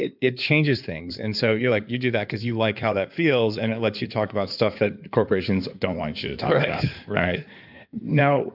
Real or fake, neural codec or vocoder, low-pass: fake; codec, 16 kHz in and 24 kHz out, 2.2 kbps, FireRedTTS-2 codec; 5.4 kHz